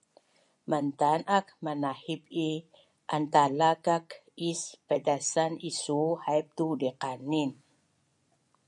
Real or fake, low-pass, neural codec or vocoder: fake; 10.8 kHz; vocoder, 24 kHz, 100 mel bands, Vocos